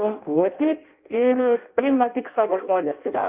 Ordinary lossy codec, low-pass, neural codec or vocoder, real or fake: Opus, 32 kbps; 3.6 kHz; codec, 16 kHz in and 24 kHz out, 0.6 kbps, FireRedTTS-2 codec; fake